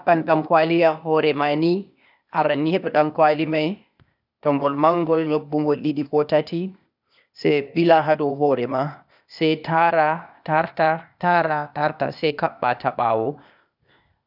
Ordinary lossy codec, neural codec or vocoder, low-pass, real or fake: none; codec, 16 kHz, 0.8 kbps, ZipCodec; 5.4 kHz; fake